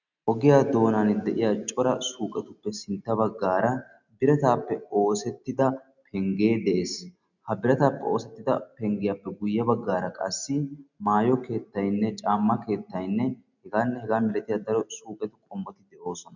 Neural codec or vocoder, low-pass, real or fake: none; 7.2 kHz; real